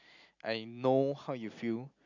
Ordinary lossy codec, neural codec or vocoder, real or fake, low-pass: none; none; real; 7.2 kHz